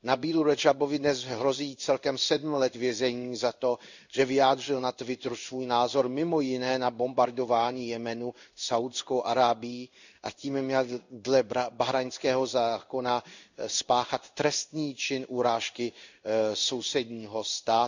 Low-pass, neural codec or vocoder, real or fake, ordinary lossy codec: 7.2 kHz; codec, 16 kHz in and 24 kHz out, 1 kbps, XY-Tokenizer; fake; none